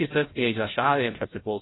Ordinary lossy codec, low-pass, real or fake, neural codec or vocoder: AAC, 16 kbps; 7.2 kHz; fake; codec, 16 kHz, 0.5 kbps, FreqCodec, larger model